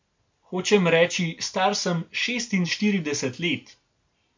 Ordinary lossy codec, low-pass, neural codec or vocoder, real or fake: MP3, 64 kbps; 7.2 kHz; none; real